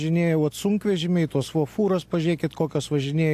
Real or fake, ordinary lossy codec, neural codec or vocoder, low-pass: real; AAC, 64 kbps; none; 14.4 kHz